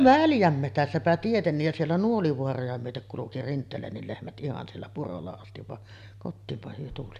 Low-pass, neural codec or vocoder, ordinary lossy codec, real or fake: 14.4 kHz; none; none; real